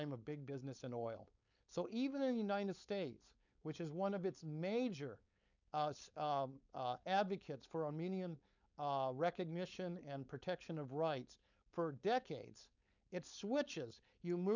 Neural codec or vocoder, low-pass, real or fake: codec, 16 kHz, 4.8 kbps, FACodec; 7.2 kHz; fake